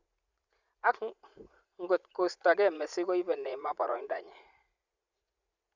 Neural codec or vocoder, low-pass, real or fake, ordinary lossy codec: vocoder, 44.1 kHz, 80 mel bands, Vocos; 7.2 kHz; fake; Opus, 64 kbps